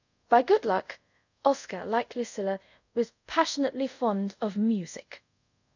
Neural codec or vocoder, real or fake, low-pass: codec, 24 kHz, 0.5 kbps, DualCodec; fake; 7.2 kHz